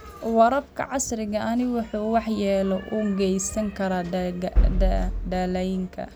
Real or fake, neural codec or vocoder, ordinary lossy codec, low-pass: real; none; none; none